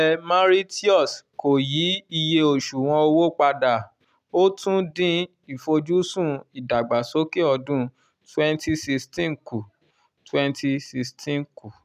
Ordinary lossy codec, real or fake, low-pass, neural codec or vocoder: none; real; 14.4 kHz; none